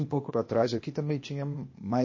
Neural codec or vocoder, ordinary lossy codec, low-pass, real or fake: codec, 16 kHz, 0.8 kbps, ZipCodec; MP3, 32 kbps; 7.2 kHz; fake